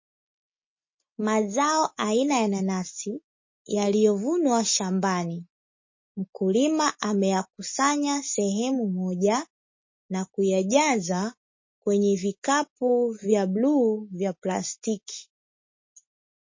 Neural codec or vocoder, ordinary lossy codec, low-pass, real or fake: none; MP3, 32 kbps; 7.2 kHz; real